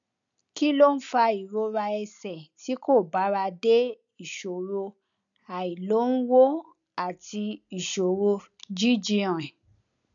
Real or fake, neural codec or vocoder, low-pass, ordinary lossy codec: real; none; 7.2 kHz; none